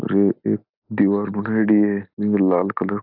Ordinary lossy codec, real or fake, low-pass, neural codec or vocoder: AAC, 48 kbps; real; 5.4 kHz; none